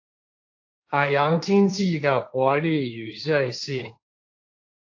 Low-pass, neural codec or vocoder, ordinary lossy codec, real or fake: 7.2 kHz; codec, 16 kHz, 1.1 kbps, Voila-Tokenizer; AAC, 48 kbps; fake